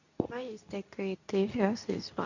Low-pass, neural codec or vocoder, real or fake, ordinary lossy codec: 7.2 kHz; codec, 24 kHz, 0.9 kbps, WavTokenizer, medium speech release version 2; fake; none